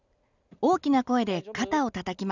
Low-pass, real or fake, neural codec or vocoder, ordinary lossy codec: 7.2 kHz; real; none; none